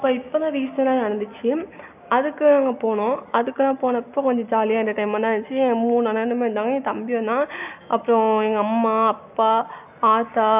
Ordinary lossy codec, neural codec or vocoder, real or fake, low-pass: none; none; real; 3.6 kHz